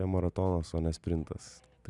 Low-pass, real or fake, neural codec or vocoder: 10.8 kHz; real; none